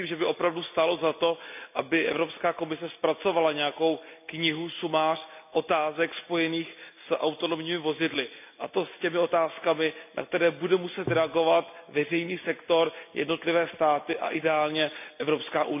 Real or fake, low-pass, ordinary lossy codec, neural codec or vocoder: real; 3.6 kHz; none; none